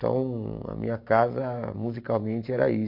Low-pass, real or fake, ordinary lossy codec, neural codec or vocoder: 5.4 kHz; fake; MP3, 48 kbps; vocoder, 44.1 kHz, 128 mel bands every 512 samples, BigVGAN v2